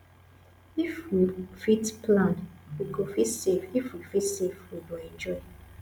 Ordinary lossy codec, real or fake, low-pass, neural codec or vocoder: none; real; none; none